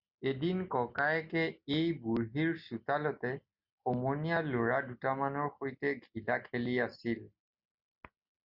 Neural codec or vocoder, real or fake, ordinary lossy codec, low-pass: none; real; Opus, 64 kbps; 5.4 kHz